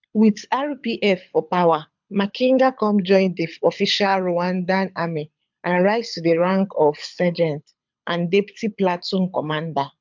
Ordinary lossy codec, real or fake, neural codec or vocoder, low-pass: MP3, 64 kbps; fake; codec, 24 kHz, 6 kbps, HILCodec; 7.2 kHz